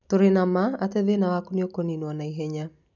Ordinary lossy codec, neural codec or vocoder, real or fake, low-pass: none; none; real; 7.2 kHz